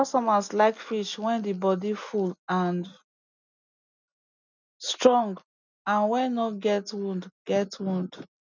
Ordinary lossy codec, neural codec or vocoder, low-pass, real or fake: none; none; 7.2 kHz; real